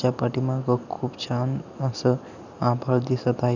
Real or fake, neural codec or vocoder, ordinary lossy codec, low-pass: real; none; none; 7.2 kHz